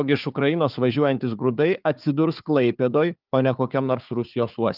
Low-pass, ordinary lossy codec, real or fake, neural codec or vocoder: 5.4 kHz; Opus, 24 kbps; fake; codec, 16 kHz, 4 kbps, FunCodec, trained on Chinese and English, 50 frames a second